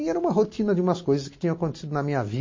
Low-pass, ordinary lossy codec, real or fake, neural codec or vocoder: 7.2 kHz; MP3, 32 kbps; real; none